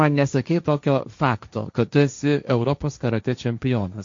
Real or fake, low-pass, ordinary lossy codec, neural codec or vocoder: fake; 7.2 kHz; MP3, 48 kbps; codec, 16 kHz, 1.1 kbps, Voila-Tokenizer